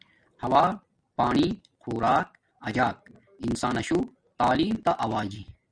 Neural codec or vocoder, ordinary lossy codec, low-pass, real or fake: none; Opus, 64 kbps; 9.9 kHz; real